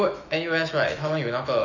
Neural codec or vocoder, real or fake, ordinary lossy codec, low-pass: autoencoder, 48 kHz, 128 numbers a frame, DAC-VAE, trained on Japanese speech; fake; none; 7.2 kHz